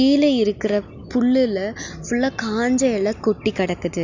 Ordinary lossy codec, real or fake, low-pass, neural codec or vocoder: Opus, 64 kbps; real; 7.2 kHz; none